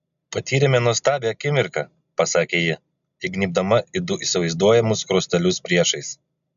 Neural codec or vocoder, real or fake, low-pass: none; real; 7.2 kHz